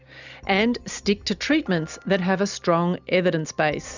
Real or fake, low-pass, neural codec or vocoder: real; 7.2 kHz; none